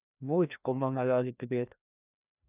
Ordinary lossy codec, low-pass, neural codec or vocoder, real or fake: none; 3.6 kHz; codec, 16 kHz, 1 kbps, FreqCodec, larger model; fake